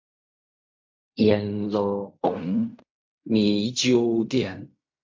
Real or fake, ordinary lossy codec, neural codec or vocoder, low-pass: fake; MP3, 48 kbps; codec, 16 kHz in and 24 kHz out, 0.4 kbps, LongCat-Audio-Codec, fine tuned four codebook decoder; 7.2 kHz